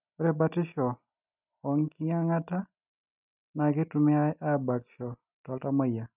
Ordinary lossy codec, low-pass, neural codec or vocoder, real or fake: none; 3.6 kHz; none; real